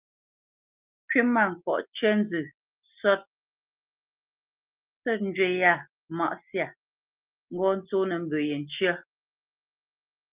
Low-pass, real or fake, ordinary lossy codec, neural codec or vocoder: 3.6 kHz; real; Opus, 32 kbps; none